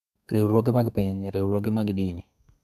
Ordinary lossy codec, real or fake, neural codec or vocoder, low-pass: none; fake; codec, 32 kHz, 1.9 kbps, SNAC; 14.4 kHz